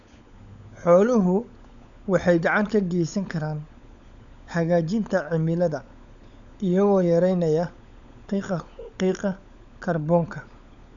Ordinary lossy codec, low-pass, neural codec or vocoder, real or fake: none; 7.2 kHz; codec, 16 kHz, 16 kbps, FunCodec, trained on LibriTTS, 50 frames a second; fake